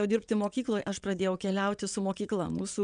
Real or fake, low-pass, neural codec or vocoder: fake; 9.9 kHz; vocoder, 22.05 kHz, 80 mel bands, WaveNeXt